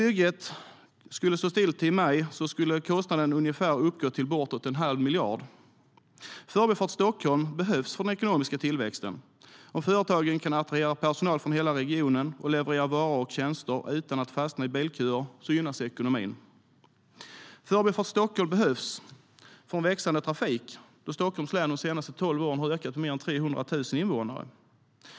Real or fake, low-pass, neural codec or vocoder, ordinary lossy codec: real; none; none; none